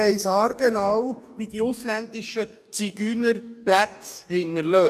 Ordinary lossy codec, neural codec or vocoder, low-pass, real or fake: none; codec, 44.1 kHz, 2.6 kbps, DAC; 14.4 kHz; fake